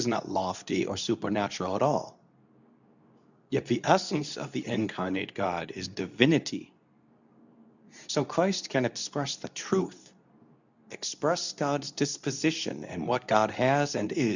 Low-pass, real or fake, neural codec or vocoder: 7.2 kHz; fake; codec, 24 kHz, 0.9 kbps, WavTokenizer, medium speech release version 2